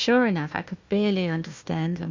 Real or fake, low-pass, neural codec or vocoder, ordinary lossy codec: fake; 7.2 kHz; codec, 16 kHz, 1 kbps, FunCodec, trained on Chinese and English, 50 frames a second; MP3, 64 kbps